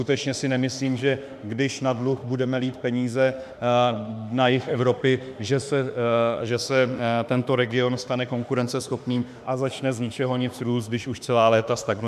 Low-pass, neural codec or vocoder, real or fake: 14.4 kHz; autoencoder, 48 kHz, 32 numbers a frame, DAC-VAE, trained on Japanese speech; fake